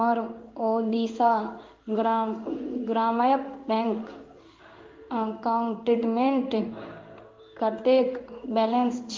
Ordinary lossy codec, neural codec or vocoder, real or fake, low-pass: Opus, 32 kbps; codec, 16 kHz in and 24 kHz out, 1 kbps, XY-Tokenizer; fake; 7.2 kHz